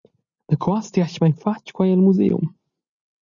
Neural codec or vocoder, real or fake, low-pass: none; real; 7.2 kHz